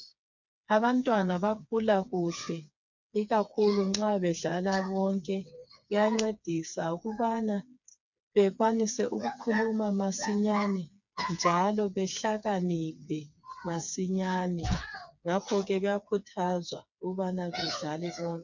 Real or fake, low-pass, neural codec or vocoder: fake; 7.2 kHz; codec, 16 kHz, 4 kbps, FreqCodec, smaller model